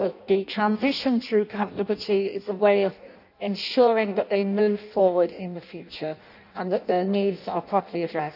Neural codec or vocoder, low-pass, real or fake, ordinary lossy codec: codec, 16 kHz in and 24 kHz out, 0.6 kbps, FireRedTTS-2 codec; 5.4 kHz; fake; none